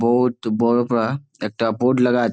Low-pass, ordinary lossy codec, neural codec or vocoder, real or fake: none; none; none; real